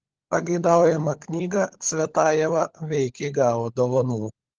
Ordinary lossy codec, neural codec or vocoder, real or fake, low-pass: Opus, 16 kbps; codec, 16 kHz, 16 kbps, FunCodec, trained on LibriTTS, 50 frames a second; fake; 7.2 kHz